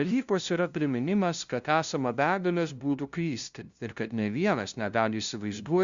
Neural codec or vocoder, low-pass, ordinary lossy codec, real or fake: codec, 16 kHz, 0.5 kbps, FunCodec, trained on LibriTTS, 25 frames a second; 7.2 kHz; Opus, 64 kbps; fake